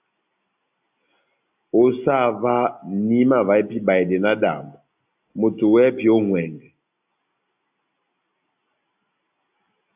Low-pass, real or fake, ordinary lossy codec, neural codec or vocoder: 3.6 kHz; real; AAC, 32 kbps; none